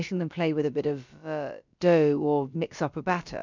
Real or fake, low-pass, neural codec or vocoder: fake; 7.2 kHz; codec, 16 kHz, about 1 kbps, DyCAST, with the encoder's durations